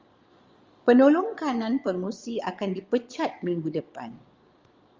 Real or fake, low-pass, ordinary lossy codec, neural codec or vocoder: fake; 7.2 kHz; Opus, 32 kbps; vocoder, 22.05 kHz, 80 mel bands, Vocos